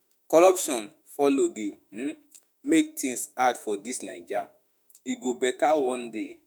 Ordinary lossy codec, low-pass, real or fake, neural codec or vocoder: none; none; fake; autoencoder, 48 kHz, 32 numbers a frame, DAC-VAE, trained on Japanese speech